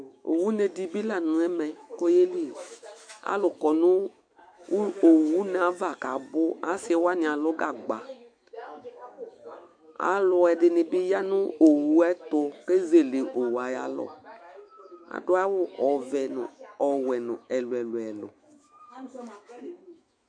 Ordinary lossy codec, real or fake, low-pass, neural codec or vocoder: MP3, 64 kbps; fake; 9.9 kHz; autoencoder, 48 kHz, 128 numbers a frame, DAC-VAE, trained on Japanese speech